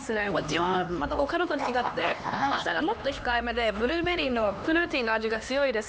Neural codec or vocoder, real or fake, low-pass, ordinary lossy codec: codec, 16 kHz, 2 kbps, X-Codec, HuBERT features, trained on LibriSpeech; fake; none; none